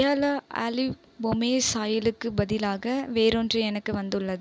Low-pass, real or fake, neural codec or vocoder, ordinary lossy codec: none; real; none; none